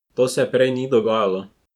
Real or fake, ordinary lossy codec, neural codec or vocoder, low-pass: fake; none; vocoder, 44.1 kHz, 128 mel bands every 512 samples, BigVGAN v2; 19.8 kHz